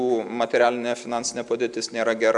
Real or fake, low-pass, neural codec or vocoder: real; 10.8 kHz; none